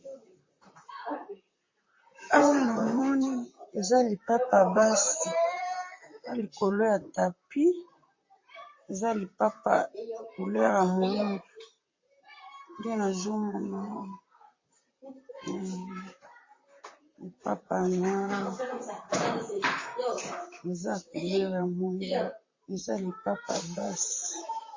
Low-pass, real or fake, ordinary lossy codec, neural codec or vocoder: 7.2 kHz; fake; MP3, 32 kbps; vocoder, 44.1 kHz, 128 mel bands, Pupu-Vocoder